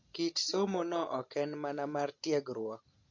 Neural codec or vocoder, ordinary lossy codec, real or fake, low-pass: none; MP3, 48 kbps; real; 7.2 kHz